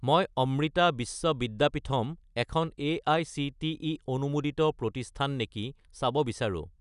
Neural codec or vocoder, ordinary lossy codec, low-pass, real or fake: none; none; 10.8 kHz; real